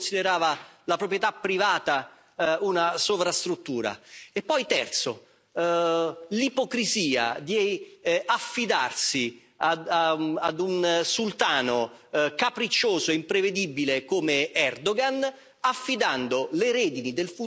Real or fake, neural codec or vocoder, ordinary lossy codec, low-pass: real; none; none; none